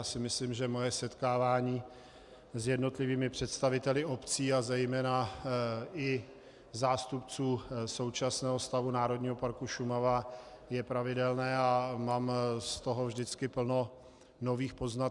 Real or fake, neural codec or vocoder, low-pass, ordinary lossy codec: real; none; 10.8 kHz; Opus, 64 kbps